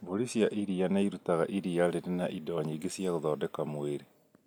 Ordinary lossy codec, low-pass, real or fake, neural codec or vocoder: none; none; real; none